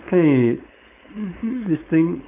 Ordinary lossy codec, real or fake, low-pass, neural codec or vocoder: none; fake; 3.6 kHz; codec, 16 kHz, 4.8 kbps, FACodec